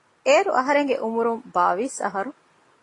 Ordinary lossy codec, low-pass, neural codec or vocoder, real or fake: AAC, 32 kbps; 10.8 kHz; none; real